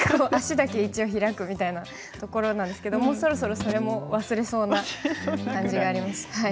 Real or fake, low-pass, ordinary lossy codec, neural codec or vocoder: real; none; none; none